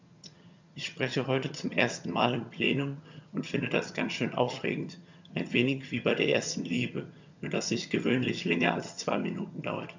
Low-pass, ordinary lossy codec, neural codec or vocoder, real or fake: 7.2 kHz; MP3, 64 kbps; vocoder, 22.05 kHz, 80 mel bands, HiFi-GAN; fake